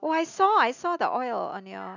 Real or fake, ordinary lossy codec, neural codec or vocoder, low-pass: real; none; none; 7.2 kHz